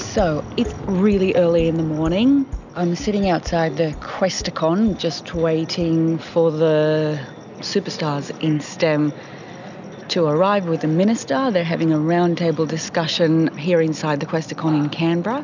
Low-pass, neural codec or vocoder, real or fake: 7.2 kHz; none; real